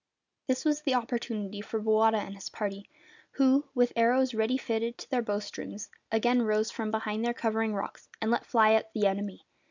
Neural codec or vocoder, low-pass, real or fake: none; 7.2 kHz; real